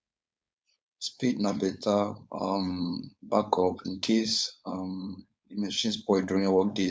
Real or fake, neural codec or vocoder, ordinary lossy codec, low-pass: fake; codec, 16 kHz, 4.8 kbps, FACodec; none; none